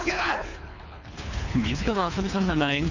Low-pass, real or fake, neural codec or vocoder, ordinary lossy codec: 7.2 kHz; fake; codec, 24 kHz, 3 kbps, HILCodec; none